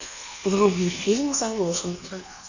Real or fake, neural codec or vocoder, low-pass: fake; codec, 24 kHz, 1.2 kbps, DualCodec; 7.2 kHz